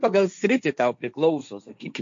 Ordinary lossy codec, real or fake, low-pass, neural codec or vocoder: MP3, 48 kbps; fake; 7.2 kHz; codec, 16 kHz, 1.1 kbps, Voila-Tokenizer